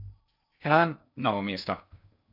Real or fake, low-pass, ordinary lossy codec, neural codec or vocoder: fake; 5.4 kHz; none; codec, 16 kHz in and 24 kHz out, 0.6 kbps, FocalCodec, streaming, 2048 codes